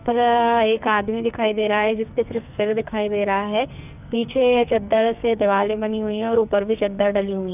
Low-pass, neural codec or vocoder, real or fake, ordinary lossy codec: 3.6 kHz; codec, 44.1 kHz, 2.6 kbps, SNAC; fake; none